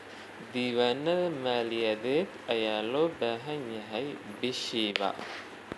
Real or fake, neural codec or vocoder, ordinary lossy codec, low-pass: real; none; none; none